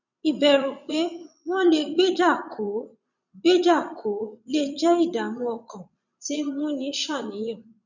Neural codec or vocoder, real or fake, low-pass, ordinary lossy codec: vocoder, 22.05 kHz, 80 mel bands, Vocos; fake; 7.2 kHz; none